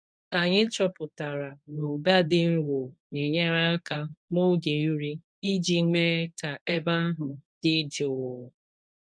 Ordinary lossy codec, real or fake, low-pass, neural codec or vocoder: none; fake; 9.9 kHz; codec, 24 kHz, 0.9 kbps, WavTokenizer, medium speech release version 1